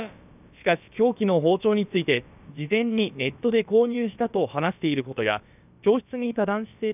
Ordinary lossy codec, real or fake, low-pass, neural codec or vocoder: none; fake; 3.6 kHz; codec, 16 kHz, about 1 kbps, DyCAST, with the encoder's durations